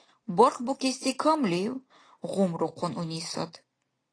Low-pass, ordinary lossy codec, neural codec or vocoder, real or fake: 9.9 kHz; AAC, 32 kbps; none; real